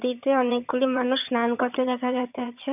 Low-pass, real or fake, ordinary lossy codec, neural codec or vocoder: 3.6 kHz; fake; none; vocoder, 22.05 kHz, 80 mel bands, HiFi-GAN